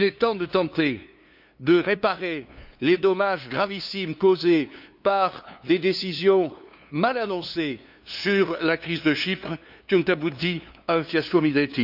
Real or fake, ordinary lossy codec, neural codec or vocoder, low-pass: fake; none; codec, 16 kHz, 2 kbps, FunCodec, trained on LibriTTS, 25 frames a second; 5.4 kHz